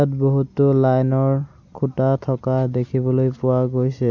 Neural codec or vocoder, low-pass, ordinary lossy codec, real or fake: none; 7.2 kHz; none; real